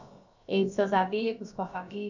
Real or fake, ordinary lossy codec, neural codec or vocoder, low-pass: fake; none; codec, 16 kHz, about 1 kbps, DyCAST, with the encoder's durations; 7.2 kHz